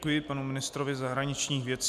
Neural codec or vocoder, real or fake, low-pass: none; real; 14.4 kHz